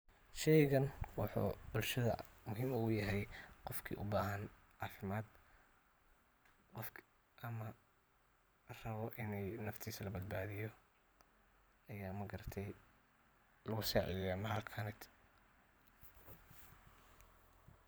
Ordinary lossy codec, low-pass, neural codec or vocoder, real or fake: none; none; vocoder, 44.1 kHz, 128 mel bands every 512 samples, BigVGAN v2; fake